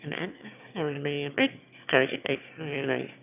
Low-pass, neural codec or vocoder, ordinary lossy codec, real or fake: 3.6 kHz; autoencoder, 22.05 kHz, a latent of 192 numbers a frame, VITS, trained on one speaker; none; fake